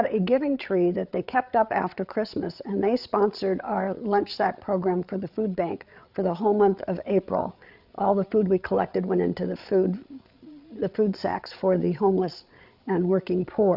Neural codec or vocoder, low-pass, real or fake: codec, 16 kHz, 8 kbps, FreqCodec, larger model; 5.4 kHz; fake